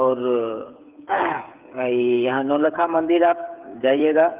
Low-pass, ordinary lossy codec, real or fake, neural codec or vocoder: 3.6 kHz; Opus, 24 kbps; fake; codec, 16 kHz, 8 kbps, FreqCodec, smaller model